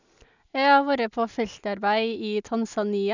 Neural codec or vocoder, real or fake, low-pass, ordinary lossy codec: none; real; 7.2 kHz; none